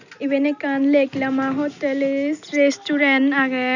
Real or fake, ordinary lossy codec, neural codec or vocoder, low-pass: real; none; none; 7.2 kHz